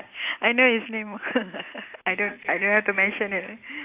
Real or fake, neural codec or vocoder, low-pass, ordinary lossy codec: real; none; 3.6 kHz; Opus, 64 kbps